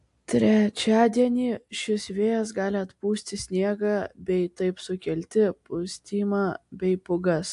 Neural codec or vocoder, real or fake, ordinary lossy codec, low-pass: none; real; AAC, 64 kbps; 10.8 kHz